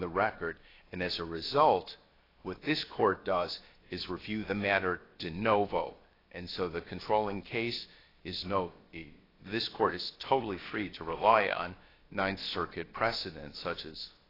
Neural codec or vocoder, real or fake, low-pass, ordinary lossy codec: codec, 16 kHz, about 1 kbps, DyCAST, with the encoder's durations; fake; 5.4 kHz; AAC, 24 kbps